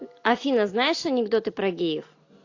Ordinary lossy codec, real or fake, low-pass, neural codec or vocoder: AAC, 48 kbps; real; 7.2 kHz; none